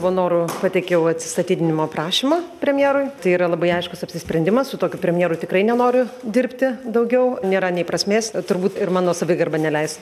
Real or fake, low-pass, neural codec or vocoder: real; 14.4 kHz; none